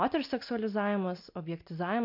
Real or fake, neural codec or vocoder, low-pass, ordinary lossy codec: real; none; 5.4 kHz; MP3, 48 kbps